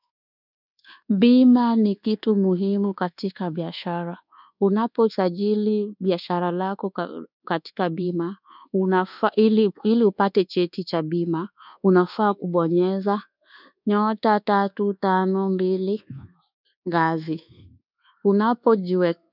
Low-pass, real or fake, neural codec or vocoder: 5.4 kHz; fake; codec, 24 kHz, 1.2 kbps, DualCodec